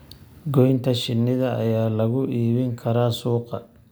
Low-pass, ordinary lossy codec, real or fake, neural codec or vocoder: none; none; real; none